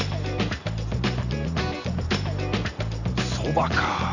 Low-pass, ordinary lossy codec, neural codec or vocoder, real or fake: 7.2 kHz; none; none; real